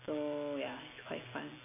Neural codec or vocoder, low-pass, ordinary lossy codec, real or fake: none; 3.6 kHz; none; real